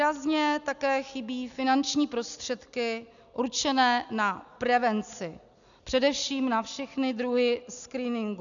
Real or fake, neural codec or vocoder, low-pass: real; none; 7.2 kHz